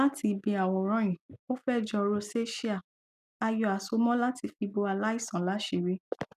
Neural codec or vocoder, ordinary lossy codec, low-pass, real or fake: none; none; 14.4 kHz; real